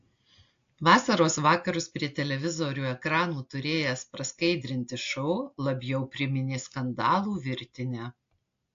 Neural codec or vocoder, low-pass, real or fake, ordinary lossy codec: none; 7.2 kHz; real; AAC, 48 kbps